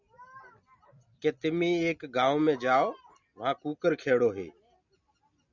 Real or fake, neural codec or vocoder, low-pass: real; none; 7.2 kHz